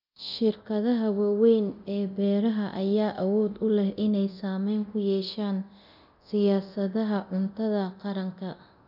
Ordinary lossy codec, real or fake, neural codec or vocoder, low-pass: none; fake; codec, 24 kHz, 0.9 kbps, DualCodec; 5.4 kHz